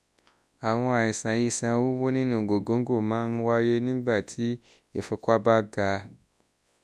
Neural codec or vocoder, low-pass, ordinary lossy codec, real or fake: codec, 24 kHz, 0.9 kbps, WavTokenizer, large speech release; none; none; fake